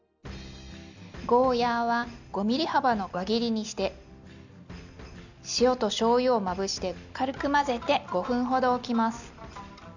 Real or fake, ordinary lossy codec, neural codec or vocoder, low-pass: real; none; none; 7.2 kHz